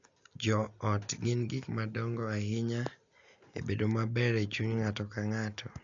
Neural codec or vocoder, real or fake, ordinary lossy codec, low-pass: none; real; AAC, 64 kbps; 7.2 kHz